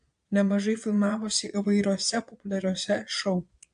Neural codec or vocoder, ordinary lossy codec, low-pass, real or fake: vocoder, 22.05 kHz, 80 mel bands, Vocos; AAC, 64 kbps; 9.9 kHz; fake